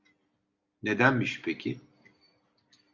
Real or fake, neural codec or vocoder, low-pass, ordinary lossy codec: real; none; 7.2 kHz; Opus, 64 kbps